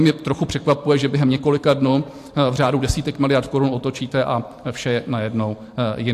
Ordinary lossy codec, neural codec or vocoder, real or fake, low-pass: AAC, 64 kbps; vocoder, 44.1 kHz, 128 mel bands every 512 samples, BigVGAN v2; fake; 14.4 kHz